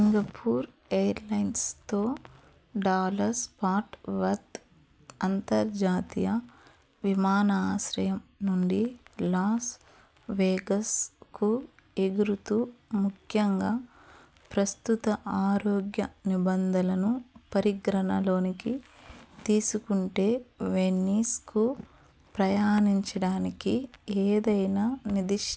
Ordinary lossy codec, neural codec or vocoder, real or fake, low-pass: none; none; real; none